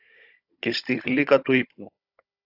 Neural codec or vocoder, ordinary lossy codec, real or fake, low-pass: codec, 16 kHz, 16 kbps, FunCodec, trained on Chinese and English, 50 frames a second; AAC, 32 kbps; fake; 5.4 kHz